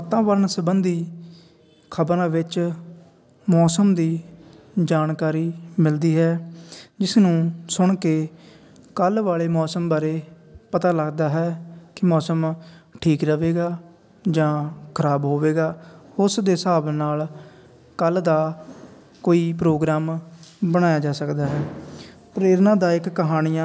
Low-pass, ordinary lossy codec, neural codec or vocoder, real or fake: none; none; none; real